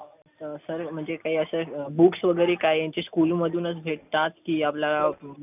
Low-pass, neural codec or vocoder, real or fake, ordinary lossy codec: 3.6 kHz; none; real; none